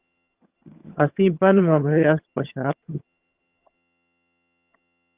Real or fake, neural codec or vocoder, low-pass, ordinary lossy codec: fake; vocoder, 22.05 kHz, 80 mel bands, HiFi-GAN; 3.6 kHz; Opus, 32 kbps